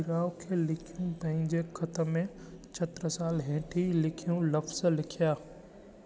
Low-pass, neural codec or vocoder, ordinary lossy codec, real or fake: none; none; none; real